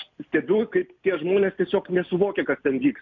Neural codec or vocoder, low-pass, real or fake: none; 7.2 kHz; real